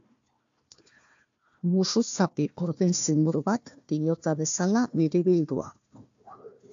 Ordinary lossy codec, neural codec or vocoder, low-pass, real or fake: AAC, 64 kbps; codec, 16 kHz, 1 kbps, FunCodec, trained on Chinese and English, 50 frames a second; 7.2 kHz; fake